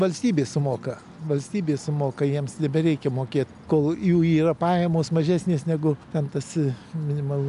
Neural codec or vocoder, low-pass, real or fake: none; 10.8 kHz; real